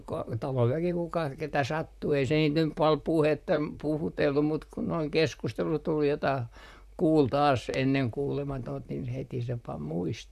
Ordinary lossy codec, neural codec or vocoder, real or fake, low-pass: none; vocoder, 44.1 kHz, 128 mel bands, Pupu-Vocoder; fake; 14.4 kHz